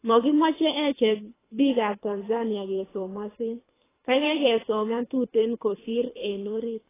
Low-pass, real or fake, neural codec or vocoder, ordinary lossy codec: 3.6 kHz; fake; codec, 24 kHz, 3 kbps, HILCodec; AAC, 16 kbps